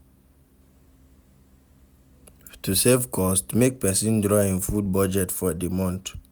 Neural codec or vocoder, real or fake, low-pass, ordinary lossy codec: none; real; none; none